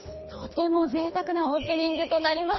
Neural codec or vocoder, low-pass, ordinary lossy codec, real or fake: codec, 24 kHz, 3 kbps, HILCodec; 7.2 kHz; MP3, 24 kbps; fake